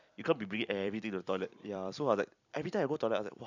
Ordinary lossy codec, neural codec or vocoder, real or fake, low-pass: none; vocoder, 44.1 kHz, 128 mel bands every 256 samples, BigVGAN v2; fake; 7.2 kHz